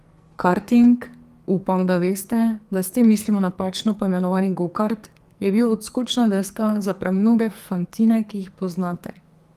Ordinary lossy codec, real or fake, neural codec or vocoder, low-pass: Opus, 32 kbps; fake; codec, 44.1 kHz, 2.6 kbps, SNAC; 14.4 kHz